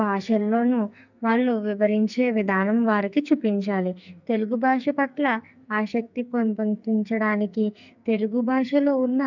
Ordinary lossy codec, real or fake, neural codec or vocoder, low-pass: none; fake; codec, 44.1 kHz, 2.6 kbps, SNAC; 7.2 kHz